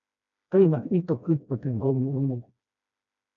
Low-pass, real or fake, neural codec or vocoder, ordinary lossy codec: 7.2 kHz; fake; codec, 16 kHz, 1 kbps, FreqCodec, smaller model; MP3, 64 kbps